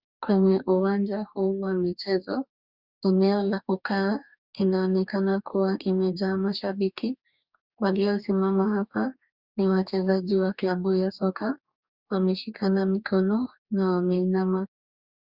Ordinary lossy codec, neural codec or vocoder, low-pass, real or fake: Opus, 64 kbps; codec, 44.1 kHz, 2.6 kbps, DAC; 5.4 kHz; fake